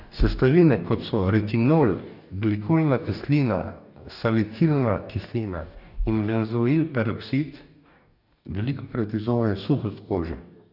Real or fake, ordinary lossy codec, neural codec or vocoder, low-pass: fake; none; codec, 44.1 kHz, 2.6 kbps, DAC; 5.4 kHz